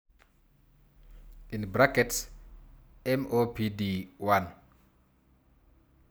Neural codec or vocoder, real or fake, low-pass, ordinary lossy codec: none; real; none; none